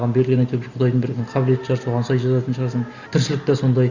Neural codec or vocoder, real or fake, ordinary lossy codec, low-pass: none; real; Opus, 64 kbps; 7.2 kHz